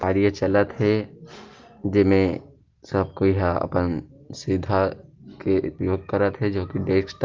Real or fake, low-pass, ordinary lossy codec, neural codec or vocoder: real; 7.2 kHz; Opus, 32 kbps; none